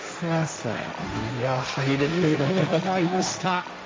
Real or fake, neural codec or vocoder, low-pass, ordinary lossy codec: fake; codec, 16 kHz, 1.1 kbps, Voila-Tokenizer; none; none